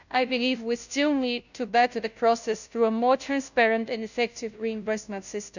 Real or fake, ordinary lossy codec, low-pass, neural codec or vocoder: fake; none; 7.2 kHz; codec, 16 kHz, 0.5 kbps, FunCodec, trained on Chinese and English, 25 frames a second